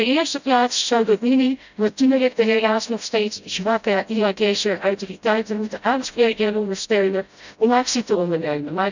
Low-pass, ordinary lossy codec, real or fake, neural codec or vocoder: 7.2 kHz; none; fake; codec, 16 kHz, 0.5 kbps, FreqCodec, smaller model